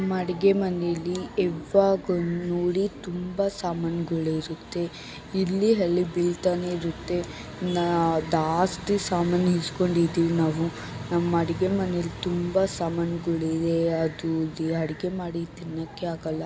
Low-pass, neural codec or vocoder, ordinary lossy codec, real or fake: none; none; none; real